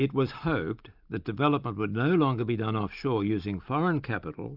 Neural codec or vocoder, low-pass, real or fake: none; 5.4 kHz; real